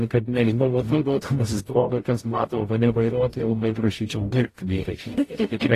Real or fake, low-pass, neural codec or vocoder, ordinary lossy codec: fake; 14.4 kHz; codec, 44.1 kHz, 0.9 kbps, DAC; AAC, 48 kbps